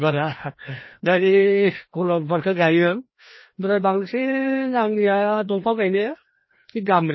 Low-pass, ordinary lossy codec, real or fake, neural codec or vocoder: 7.2 kHz; MP3, 24 kbps; fake; codec, 16 kHz, 1 kbps, FreqCodec, larger model